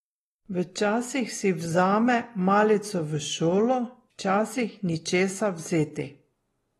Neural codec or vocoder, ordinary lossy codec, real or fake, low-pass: none; AAC, 32 kbps; real; 19.8 kHz